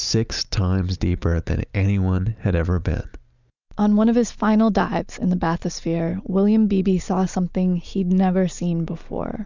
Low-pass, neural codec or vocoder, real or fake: 7.2 kHz; none; real